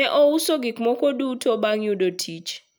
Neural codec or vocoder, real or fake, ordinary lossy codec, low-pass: none; real; none; none